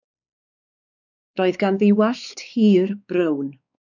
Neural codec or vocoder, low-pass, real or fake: codec, 16 kHz, 4 kbps, X-Codec, WavLM features, trained on Multilingual LibriSpeech; 7.2 kHz; fake